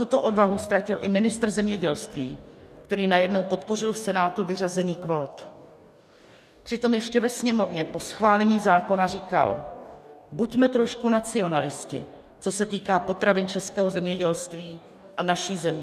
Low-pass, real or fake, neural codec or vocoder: 14.4 kHz; fake; codec, 44.1 kHz, 2.6 kbps, DAC